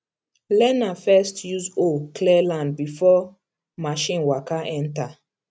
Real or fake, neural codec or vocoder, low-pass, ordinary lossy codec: real; none; none; none